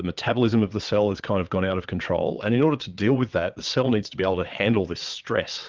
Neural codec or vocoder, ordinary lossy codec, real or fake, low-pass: vocoder, 22.05 kHz, 80 mel bands, WaveNeXt; Opus, 32 kbps; fake; 7.2 kHz